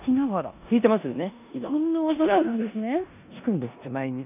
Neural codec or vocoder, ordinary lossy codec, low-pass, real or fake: codec, 16 kHz in and 24 kHz out, 0.9 kbps, LongCat-Audio-Codec, four codebook decoder; none; 3.6 kHz; fake